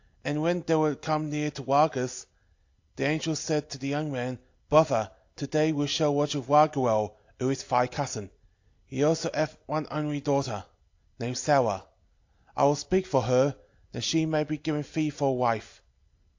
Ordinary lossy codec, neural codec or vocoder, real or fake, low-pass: AAC, 48 kbps; none; real; 7.2 kHz